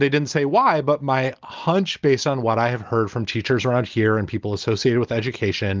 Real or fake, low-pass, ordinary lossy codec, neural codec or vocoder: real; 7.2 kHz; Opus, 32 kbps; none